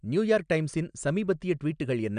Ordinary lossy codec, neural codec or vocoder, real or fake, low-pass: none; none; real; 9.9 kHz